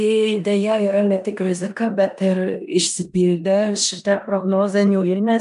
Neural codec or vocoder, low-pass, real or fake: codec, 16 kHz in and 24 kHz out, 0.9 kbps, LongCat-Audio-Codec, four codebook decoder; 10.8 kHz; fake